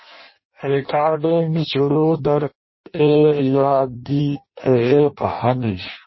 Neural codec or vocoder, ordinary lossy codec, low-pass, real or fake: codec, 16 kHz in and 24 kHz out, 0.6 kbps, FireRedTTS-2 codec; MP3, 24 kbps; 7.2 kHz; fake